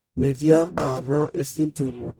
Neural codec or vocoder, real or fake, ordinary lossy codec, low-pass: codec, 44.1 kHz, 0.9 kbps, DAC; fake; none; none